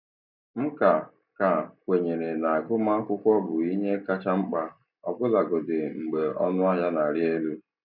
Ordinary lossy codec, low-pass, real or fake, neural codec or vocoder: none; 5.4 kHz; real; none